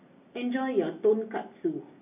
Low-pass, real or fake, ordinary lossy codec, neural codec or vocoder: 3.6 kHz; real; none; none